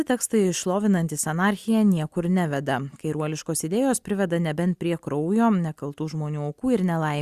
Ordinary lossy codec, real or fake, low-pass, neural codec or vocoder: Opus, 64 kbps; real; 14.4 kHz; none